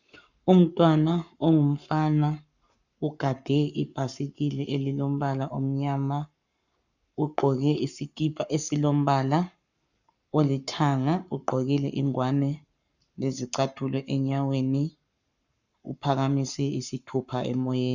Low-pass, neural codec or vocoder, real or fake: 7.2 kHz; codec, 44.1 kHz, 7.8 kbps, Pupu-Codec; fake